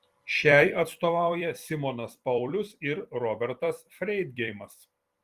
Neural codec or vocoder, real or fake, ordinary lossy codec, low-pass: vocoder, 44.1 kHz, 128 mel bands every 256 samples, BigVGAN v2; fake; Opus, 24 kbps; 14.4 kHz